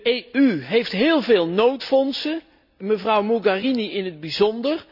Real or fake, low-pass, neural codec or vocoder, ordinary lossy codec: real; 5.4 kHz; none; none